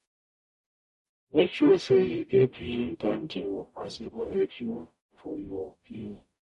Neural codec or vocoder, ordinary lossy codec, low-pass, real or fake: codec, 44.1 kHz, 0.9 kbps, DAC; MP3, 48 kbps; 14.4 kHz; fake